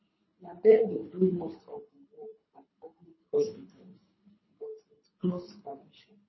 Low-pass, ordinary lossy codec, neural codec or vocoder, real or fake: 7.2 kHz; MP3, 24 kbps; codec, 24 kHz, 3 kbps, HILCodec; fake